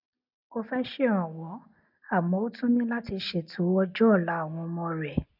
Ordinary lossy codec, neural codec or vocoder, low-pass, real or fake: none; none; 5.4 kHz; real